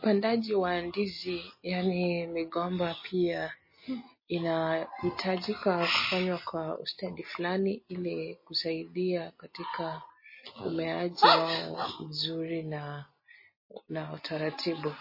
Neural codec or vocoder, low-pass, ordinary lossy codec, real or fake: none; 5.4 kHz; MP3, 24 kbps; real